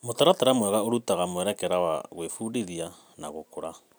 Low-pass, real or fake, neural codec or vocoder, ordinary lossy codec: none; real; none; none